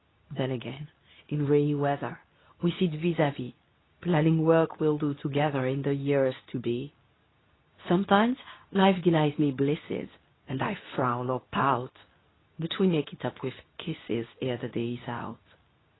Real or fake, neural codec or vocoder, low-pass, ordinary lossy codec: fake; codec, 24 kHz, 0.9 kbps, WavTokenizer, medium speech release version 2; 7.2 kHz; AAC, 16 kbps